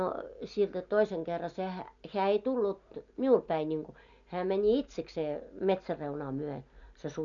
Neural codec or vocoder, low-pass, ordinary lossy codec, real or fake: none; 7.2 kHz; none; real